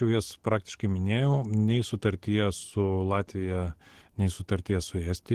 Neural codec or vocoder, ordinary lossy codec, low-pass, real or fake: codec, 44.1 kHz, 7.8 kbps, DAC; Opus, 16 kbps; 14.4 kHz; fake